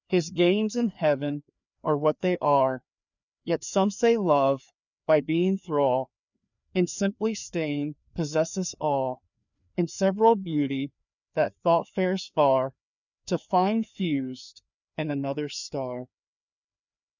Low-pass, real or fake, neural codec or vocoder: 7.2 kHz; fake; codec, 16 kHz, 2 kbps, FreqCodec, larger model